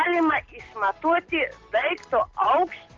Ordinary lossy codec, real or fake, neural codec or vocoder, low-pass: Opus, 16 kbps; real; none; 7.2 kHz